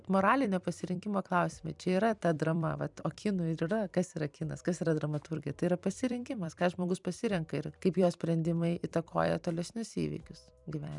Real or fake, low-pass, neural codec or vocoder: fake; 10.8 kHz; vocoder, 44.1 kHz, 128 mel bands every 256 samples, BigVGAN v2